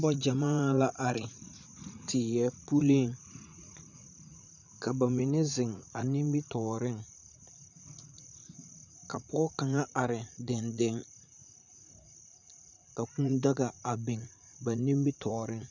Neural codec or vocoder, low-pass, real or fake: vocoder, 44.1 kHz, 80 mel bands, Vocos; 7.2 kHz; fake